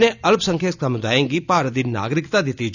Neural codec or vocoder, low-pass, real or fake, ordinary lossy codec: none; 7.2 kHz; real; none